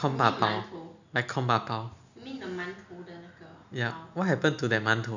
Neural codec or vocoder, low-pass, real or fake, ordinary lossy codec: none; 7.2 kHz; real; none